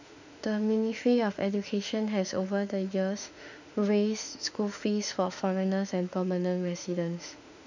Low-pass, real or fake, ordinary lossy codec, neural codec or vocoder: 7.2 kHz; fake; none; autoencoder, 48 kHz, 32 numbers a frame, DAC-VAE, trained on Japanese speech